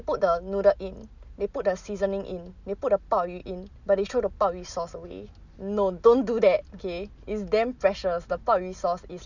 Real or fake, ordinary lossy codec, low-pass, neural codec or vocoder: real; none; 7.2 kHz; none